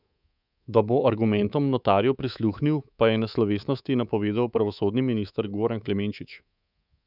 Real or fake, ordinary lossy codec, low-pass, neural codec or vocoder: fake; none; 5.4 kHz; codec, 24 kHz, 3.1 kbps, DualCodec